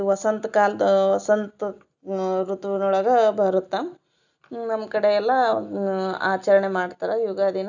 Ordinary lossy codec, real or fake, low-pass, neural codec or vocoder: none; real; 7.2 kHz; none